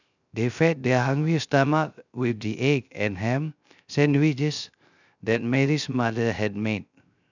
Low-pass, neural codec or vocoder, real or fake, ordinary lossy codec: 7.2 kHz; codec, 16 kHz, 0.3 kbps, FocalCodec; fake; none